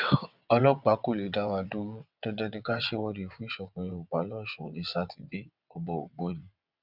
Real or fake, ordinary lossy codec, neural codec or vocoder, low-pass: fake; none; vocoder, 22.05 kHz, 80 mel bands, WaveNeXt; 5.4 kHz